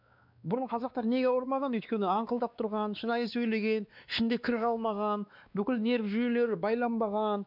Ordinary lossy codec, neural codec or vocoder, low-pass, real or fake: none; codec, 16 kHz, 2 kbps, X-Codec, WavLM features, trained on Multilingual LibriSpeech; 5.4 kHz; fake